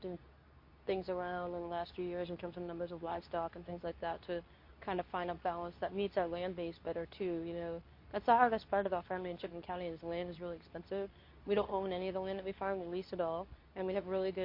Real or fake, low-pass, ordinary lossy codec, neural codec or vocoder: fake; 5.4 kHz; MP3, 32 kbps; codec, 24 kHz, 0.9 kbps, WavTokenizer, medium speech release version 2